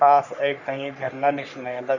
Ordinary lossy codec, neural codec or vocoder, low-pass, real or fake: none; codec, 44.1 kHz, 3.4 kbps, Pupu-Codec; 7.2 kHz; fake